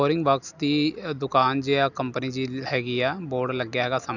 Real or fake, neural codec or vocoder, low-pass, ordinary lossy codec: real; none; 7.2 kHz; none